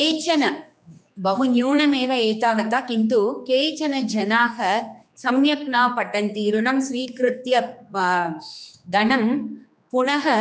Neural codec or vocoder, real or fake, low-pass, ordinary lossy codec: codec, 16 kHz, 2 kbps, X-Codec, HuBERT features, trained on general audio; fake; none; none